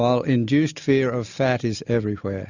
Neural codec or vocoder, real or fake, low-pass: none; real; 7.2 kHz